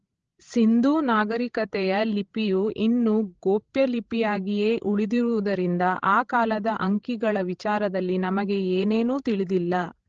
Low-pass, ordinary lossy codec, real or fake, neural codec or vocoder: 7.2 kHz; Opus, 16 kbps; fake; codec, 16 kHz, 16 kbps, FreqCodec, larger model